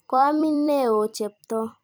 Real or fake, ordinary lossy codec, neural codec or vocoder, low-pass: fake; none; vocoder, 44.1 kHz, 128 mel bands every 256 samples, BigVGAN v2; none